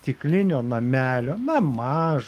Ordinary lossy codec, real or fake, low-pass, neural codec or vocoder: Opus, 32 kbps; fake; 14.4 kHz; codec, 44.1 kHz, 7.8 kbps, DAC